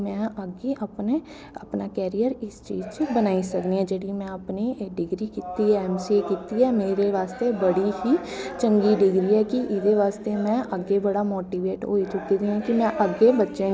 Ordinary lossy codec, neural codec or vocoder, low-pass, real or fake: none; none; none; real